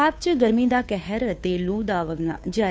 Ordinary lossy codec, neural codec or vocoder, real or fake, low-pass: none; codec, 16 kHz, 2 kbps, FunCodec, trained on Chinese and English, 25 frames a second; fake; none